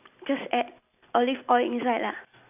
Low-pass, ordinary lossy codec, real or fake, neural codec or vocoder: 3.6 kHz; none; real; none